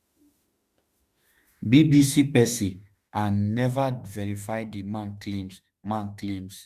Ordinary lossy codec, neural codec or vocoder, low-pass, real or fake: Opus, 64 kbps; autoencoder, 48 kHz, 32 numbers a frame, DAC-VAE, trained on Japanese speech; 14.4 kHz; fake